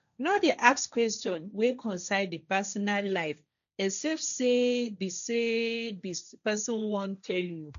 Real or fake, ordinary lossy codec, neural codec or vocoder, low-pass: fake; none; codec, 16 kHz, 1.1 kbps, Voila-Tokenizer; 7.2 kHz